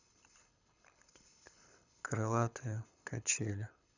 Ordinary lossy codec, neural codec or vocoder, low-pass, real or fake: none; codec, 24 kHz, 6 kbps, HILCodec; 7.2 kHz; fake